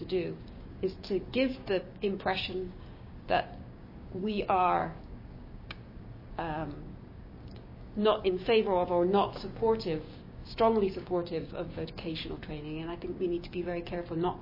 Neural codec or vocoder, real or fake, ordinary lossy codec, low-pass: codec, 16 kHz, 6 kbps, DAC; fake; MP3, 24 kbps; 5.4 kHz